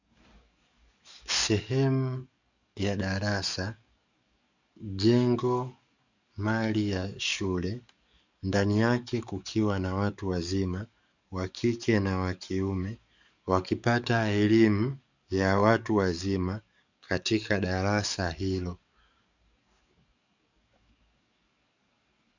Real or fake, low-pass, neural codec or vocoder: fake; 7.2 kHz; codec, 44.1 kHz, 7.8 kbps, DAC